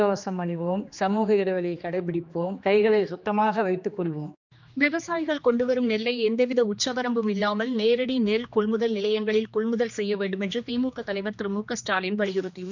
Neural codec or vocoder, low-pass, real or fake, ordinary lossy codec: codec, 16 kHz, 2 kbps, X-Codec, HuBERT features, trained on general audio; 7.2 kHz; fake; none